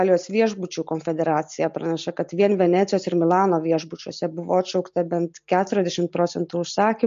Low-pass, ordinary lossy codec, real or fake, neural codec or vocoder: 7.2 kHz; MP3, 48 kbps; real; none